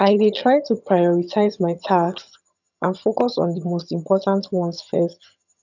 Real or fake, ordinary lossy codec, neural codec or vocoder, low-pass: fake; none; vocoder, 22.05 kHz, 80 mel bands, HiFi-GAN; 7.2 kHz